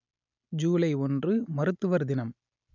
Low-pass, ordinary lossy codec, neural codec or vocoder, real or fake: 7.2 kHz; none; none; real